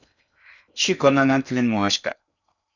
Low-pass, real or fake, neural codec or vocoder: 7.2 kHz; fake; codec, 16 kHz in and 24 kHz out, 0.8 kbps, FocalCodec, streaming, 65536 codes